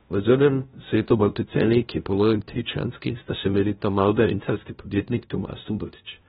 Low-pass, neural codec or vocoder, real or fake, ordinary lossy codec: 7.2 kHz; codec, 16 kHz, 0.5 kbps, FunCodec, trained on LibriTTS, 25 frames a second; fake; AAC, 16 kbps